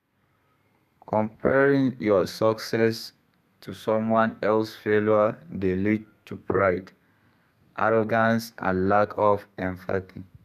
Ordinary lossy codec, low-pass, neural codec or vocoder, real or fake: none; 14.4 kHz; codec, 32 kHz, 1.9 kbps, SNAC; fake